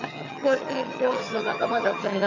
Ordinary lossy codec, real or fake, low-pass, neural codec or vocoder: none; fake; 7.2 kHz; vocoder, 22.05 kHz, 80 mel bands, HiFi-GAN